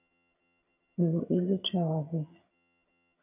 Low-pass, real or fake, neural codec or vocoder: 3.6 kHz; fake; vocoder, 22.05 kHz, 80 mel bands, HiFi-GAN